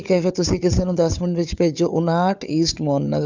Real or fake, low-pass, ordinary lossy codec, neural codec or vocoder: fake; 7.2 kHz; none; codec, 16 kHz, 4 kbps, FunCodec, trained on Chinese and English, 50 frames a second